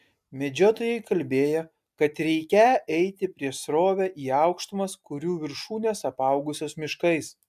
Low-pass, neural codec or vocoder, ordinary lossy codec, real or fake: 14.4 kHz; none; MP3, 96 kbps; real